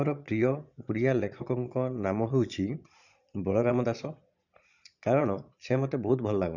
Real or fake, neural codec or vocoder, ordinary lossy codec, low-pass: fake; vocoder, 22.05 kHz, 80 mel bands, Vocos; none; 7.2 kHz